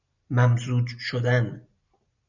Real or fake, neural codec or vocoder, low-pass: real; none; 7.2 kHz